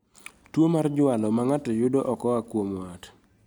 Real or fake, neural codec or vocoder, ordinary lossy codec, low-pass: real; none; none; none